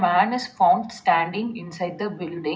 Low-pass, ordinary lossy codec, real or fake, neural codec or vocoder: none; none; real; none